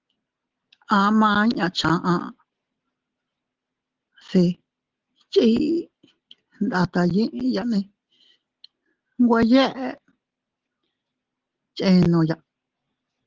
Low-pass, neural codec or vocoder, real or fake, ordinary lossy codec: 7.2 kHz; none; real; Opus, 16 kbps